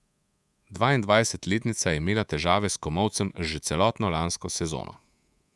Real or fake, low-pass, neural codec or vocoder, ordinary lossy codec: fake; none; codec, 24 kHz, 3.1 kbps, DualCodec; none